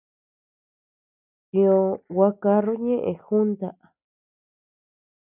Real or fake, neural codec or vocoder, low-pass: real; none; 3.6 kHz